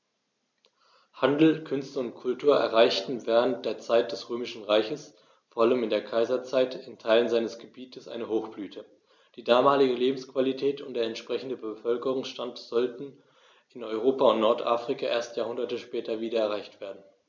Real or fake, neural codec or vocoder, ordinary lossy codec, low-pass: real; none; none; 7.2 kHz